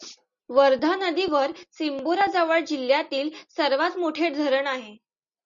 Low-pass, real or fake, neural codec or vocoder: 7.2 kHz; real; none